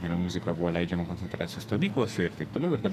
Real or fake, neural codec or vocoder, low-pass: fake; codec, 44.1 kHz, 2.6 kbps, SNAC; 14.4 kHz